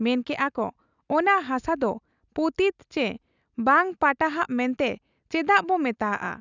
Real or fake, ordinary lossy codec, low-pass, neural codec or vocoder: real; none; 7.2 kHz; none